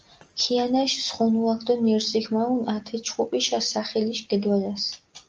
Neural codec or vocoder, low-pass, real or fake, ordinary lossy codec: none; 7.2 kHz; real; Opus, 24 kbps